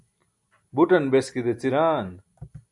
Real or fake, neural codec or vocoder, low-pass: real; none; 10.8 kHz